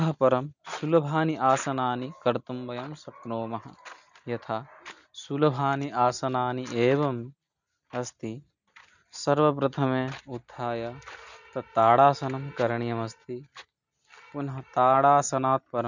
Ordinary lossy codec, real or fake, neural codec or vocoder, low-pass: none; real; none; 7.2 kHz